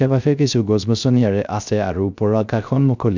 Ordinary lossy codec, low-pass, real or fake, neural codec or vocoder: none; 7.2 kHz; fake; codec, 16 kHz, 0.3 kbps, FocalCodec